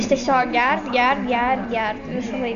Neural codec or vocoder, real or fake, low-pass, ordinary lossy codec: none; real; 7.2 kHz; MP3, 64 kbps